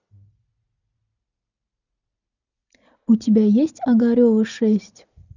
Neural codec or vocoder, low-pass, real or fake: none; 7.2 kHz; real